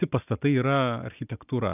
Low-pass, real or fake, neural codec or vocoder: 3.6 kHz; real; none